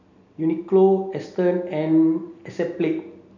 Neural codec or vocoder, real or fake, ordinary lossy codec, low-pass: none; real; none; 7.2 kHz